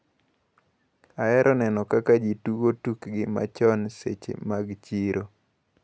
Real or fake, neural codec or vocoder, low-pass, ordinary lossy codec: real; none; none; none